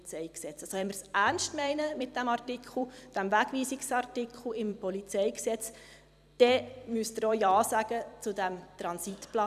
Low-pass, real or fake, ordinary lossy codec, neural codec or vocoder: 14.4 kHz; fake; none; vocoder, 44.1 kHz, 128 mel bands every 256 samples, BigVGAN v2